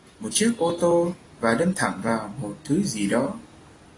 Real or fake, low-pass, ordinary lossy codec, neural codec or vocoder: real; 10.8 kHz; AAC, 32 kbps; none